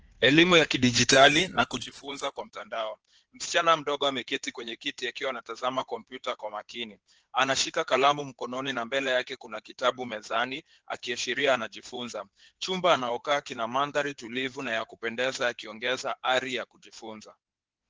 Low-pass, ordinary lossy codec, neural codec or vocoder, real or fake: 7.2 kHz; Opus, 16 kbps; codec, 16 kHz in and 24 kHz out, 2.2 kbps, FireRedTTS-2 codec; fake